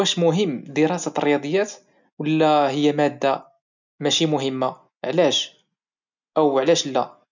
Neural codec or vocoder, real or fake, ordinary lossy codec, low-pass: none; real; none; 7.2 kHz